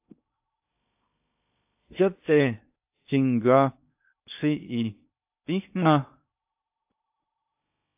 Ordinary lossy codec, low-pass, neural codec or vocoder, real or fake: AAC, 32 kbps; 3.6 kHz; codec, 16 kHz in and 24 kHz out, 0.6 kbps, FocalCodec, streaming, 2048 codes; fake